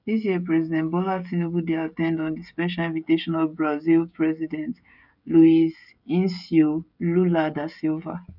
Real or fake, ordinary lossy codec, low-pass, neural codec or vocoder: fake; none; 5.4 kHz; codec, 16 kHz, 16 kbps, FreqCodec, smaller model